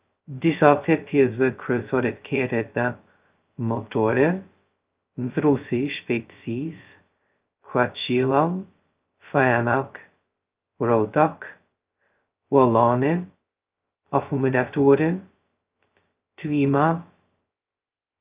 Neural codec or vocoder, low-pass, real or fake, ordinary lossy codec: codec, 16 kHz, 0.2 kbps, FocalCodec; 3.6 kHz; fake; Opus, 24 kbps